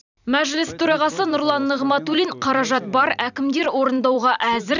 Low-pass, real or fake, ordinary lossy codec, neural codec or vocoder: 7.2 kHz; fake; none; vocoder, 44.1 kHz, 128 mel bands every 256 samples, BigVGAN v2